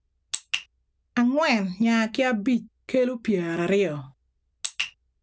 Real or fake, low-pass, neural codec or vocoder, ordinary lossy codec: real; none; none; none